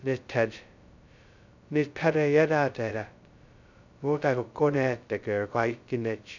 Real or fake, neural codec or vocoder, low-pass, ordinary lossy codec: fake; codec, 16 kHz, 0.2 kbps, FocalCodec; 7.2 kHz; none